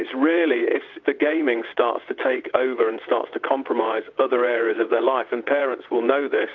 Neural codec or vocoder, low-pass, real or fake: vocoder, 22.05 kHz, 80 mel bands, WaveNeXt; 7.2 kHz; fake